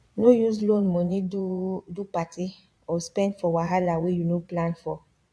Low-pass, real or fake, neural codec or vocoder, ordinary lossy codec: none; fake; vocoder, 22.05 kHz, 80 mel bands, Vocos; none